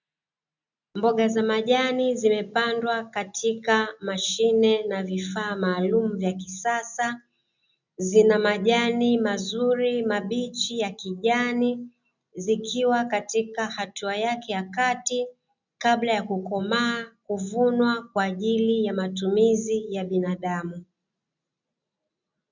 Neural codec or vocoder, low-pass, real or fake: none; 7.2 kHz; real